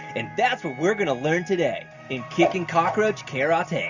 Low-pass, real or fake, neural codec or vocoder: 7.2 kHz; real; none